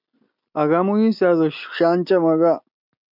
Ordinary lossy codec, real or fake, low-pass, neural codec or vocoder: MP3, 48 kbps; real; 5.4 kHz; none